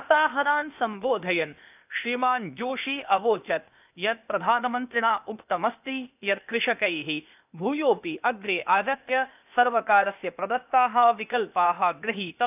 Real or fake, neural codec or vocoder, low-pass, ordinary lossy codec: fake; codec, 16 kHz, 0.8 kbps, ZipCodec; 3.6 kHz; none